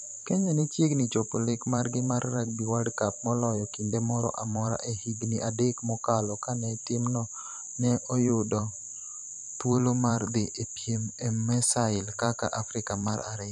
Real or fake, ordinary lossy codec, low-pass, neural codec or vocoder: fake; none; 10.8 kHz; vocoder, 44.1 kHz, 128 mel bands every 512 samples, BigVGAN v2